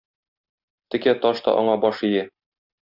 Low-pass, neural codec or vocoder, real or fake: 5.4 kHz; none; real